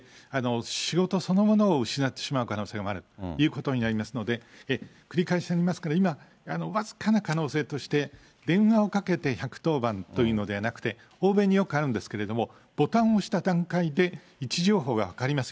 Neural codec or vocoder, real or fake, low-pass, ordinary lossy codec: none; real; none; none